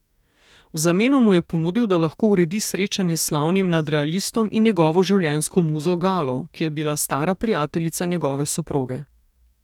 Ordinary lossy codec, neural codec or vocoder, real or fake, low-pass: none; codec, 44.1 kHz, 2.6 kbps, DAC; fake; 19.8 kHz